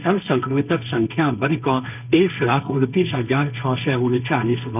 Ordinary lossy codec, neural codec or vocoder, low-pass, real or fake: none; codec, 16 kHz, 1.1 kbps, Voila-Tokenizer; 3.6 kHz; fake